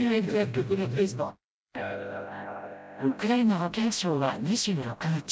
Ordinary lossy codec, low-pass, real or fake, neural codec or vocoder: none; none; fake; codec, 16 kHz, 0.5 kbps, FreqCodec, smaller model